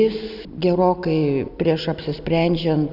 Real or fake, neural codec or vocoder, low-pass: real; none; 5.4 kHz